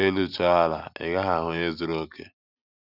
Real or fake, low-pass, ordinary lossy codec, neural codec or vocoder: real; 5.4 kHz; none; none